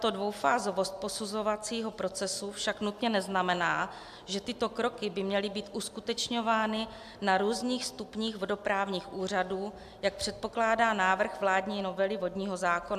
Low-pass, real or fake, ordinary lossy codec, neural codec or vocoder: 14.4 kHz; real; AAC, 96 kbps; none